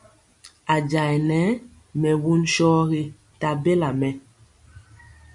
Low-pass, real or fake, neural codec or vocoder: 10.8 kHz; real; none